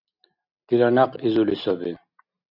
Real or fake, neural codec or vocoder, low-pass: real; none; 5.4 kHz